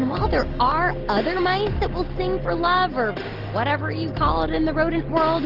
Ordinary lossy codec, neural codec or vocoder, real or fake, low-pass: Opus, 16 kbps; none; real; 5.4 kHz